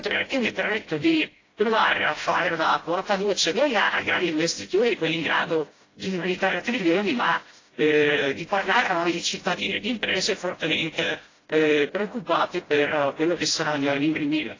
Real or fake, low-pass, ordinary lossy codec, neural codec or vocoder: fake; 7.2 kHz; AAC, 32 kbps; codec, 16 kHz, 0.5 kbps, FreqCodec, smaller model